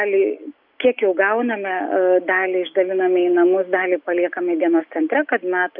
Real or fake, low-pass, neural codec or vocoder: real; 5.4 kHz; none